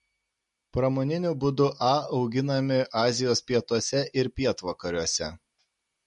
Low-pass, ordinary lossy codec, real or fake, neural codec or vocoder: 14.4 kHz; MP3, 48 kbps; real; none